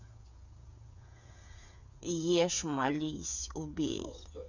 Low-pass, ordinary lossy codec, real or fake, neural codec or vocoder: 7.2 kHz; none; fake; codec, 16 kHz, 8 kbps, FreqCodec, smaller model